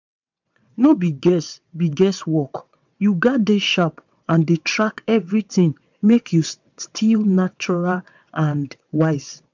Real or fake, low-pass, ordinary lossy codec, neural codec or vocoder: fake; 7.2 kHz; MP3, 64 kbps; vocoder, 22.05 kHz, 80 mel bands, WaveNeXt